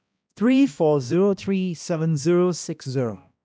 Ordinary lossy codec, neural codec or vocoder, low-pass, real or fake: none; codec, 16 kHz, 1 kbps, X-Codec, HuBERT features, trained on balanced general audio; none; fake